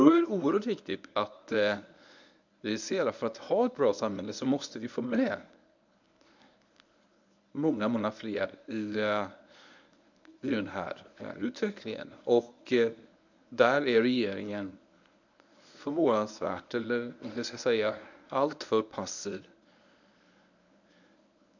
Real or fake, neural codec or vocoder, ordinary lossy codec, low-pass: fake; codec, 24 kHz, 0.9 kbps, WavTokenizer, medium speech release version 1; none; 7.2 kHz